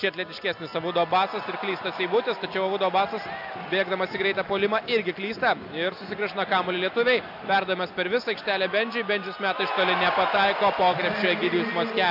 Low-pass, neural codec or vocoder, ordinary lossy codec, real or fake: 5.4 kHz; none; AAC, 48 kbps; real